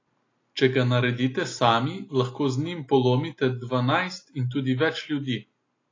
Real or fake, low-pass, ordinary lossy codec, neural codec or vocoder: real; 7.2 kHz; AAC, 32 kbps; none